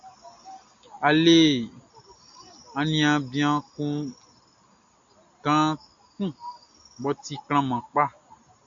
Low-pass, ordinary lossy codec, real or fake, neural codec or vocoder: 7.2 kHz; AAC, 64 kbps; real; none